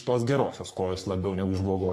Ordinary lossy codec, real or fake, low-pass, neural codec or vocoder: AAC, 64 kbps; fake; 14.4 kHz; codec, 44.1 kHz, 3.4 kbps, Pupu-Codec